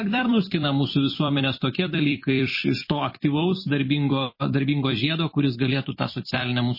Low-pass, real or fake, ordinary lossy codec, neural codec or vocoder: 5.4 kHz; fake; MP3, 24 kbps; vocoder, 44.1 kHz, 128 mel bands every 256 samples, BigVGAN v2